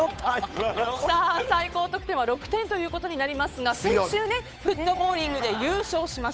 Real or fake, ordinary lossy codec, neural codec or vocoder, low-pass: fake; none; codec, 16 kHz, 8 kbps, FunCodec, trained on Chinese and English, 25 frames a second; none